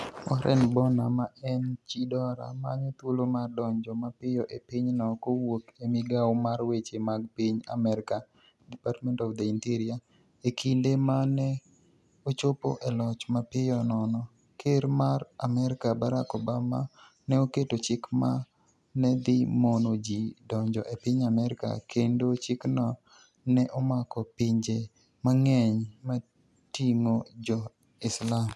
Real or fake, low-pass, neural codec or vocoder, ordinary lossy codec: real; none; none; none